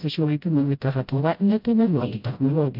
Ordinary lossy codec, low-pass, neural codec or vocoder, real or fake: MP3, 48 kbps; 5.4 kHz; codec, 16 kHz, 0.5 kbps, FreqCodec, smaller model; fake